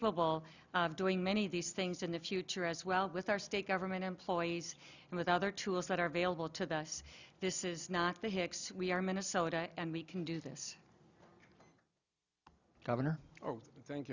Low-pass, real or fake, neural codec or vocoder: 7.2 kHz; real; none